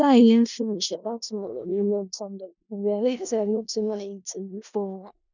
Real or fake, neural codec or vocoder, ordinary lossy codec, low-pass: fake; codec, 16 kHz in and 24 kHz out, 0.4 kbps, LongCat-Audio-Codec, four codebook decoder; none; 7.2 kHz